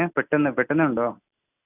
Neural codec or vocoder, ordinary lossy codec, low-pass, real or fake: none; none; 3.6 kHz; real